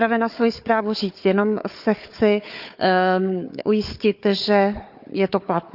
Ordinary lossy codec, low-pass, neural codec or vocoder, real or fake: none; 5.4 kHz; codec, 16 kHz, 4 kbps, FunCodec, trained on Chinese and English, 50 frames a second; fake